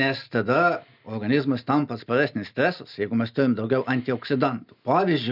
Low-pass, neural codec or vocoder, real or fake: 5.4 kHz; none; real